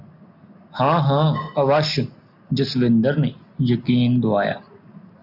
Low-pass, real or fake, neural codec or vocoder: 5.4 kHz; real; none